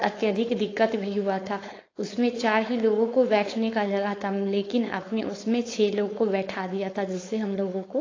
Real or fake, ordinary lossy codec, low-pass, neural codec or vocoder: fake; AAC, 32 kbps; 7.2 kHz; codec, 16 kHz, 4.8 kbps, FACodec